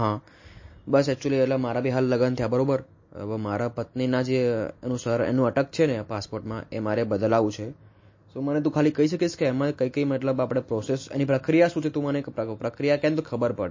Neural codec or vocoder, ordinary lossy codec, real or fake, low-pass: none; MP3, 32 kbps; real; 7.2 kHz